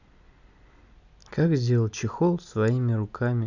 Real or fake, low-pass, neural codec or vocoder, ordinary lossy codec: real; 7.2 kHz; none; none